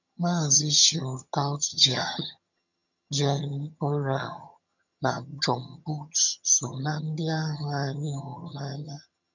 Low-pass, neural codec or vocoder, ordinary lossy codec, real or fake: 7.2 kHz; vocoder, 22.05 kHz, 80 mel bands, HiFi-GAN; none; fake